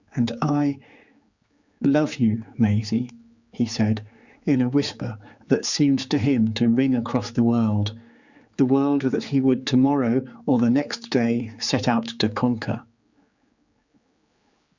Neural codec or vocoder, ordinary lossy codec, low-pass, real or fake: codec, 16 kHz, 4 kbps, X-Codec, HuBERT features, trained on general audio; Opus, 64 kbps; 7.2 kHz; fake